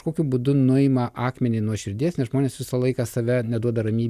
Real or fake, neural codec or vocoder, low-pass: real; none; 14.4 kHz